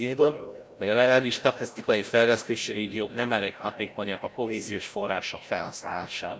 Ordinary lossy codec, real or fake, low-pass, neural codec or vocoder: none; fake; none; codec, 16 kHz, 0.5 kbps, FreqCodec, larger model